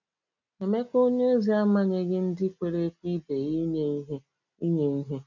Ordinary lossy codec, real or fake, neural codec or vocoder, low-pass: none; real; none; 7.2 kHz